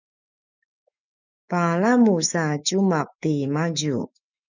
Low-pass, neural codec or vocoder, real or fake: 7.2 kHz; autoencoder, 48 kHz, 128 numbers a frame, DAC-VAE, trained on Japanese speech; fake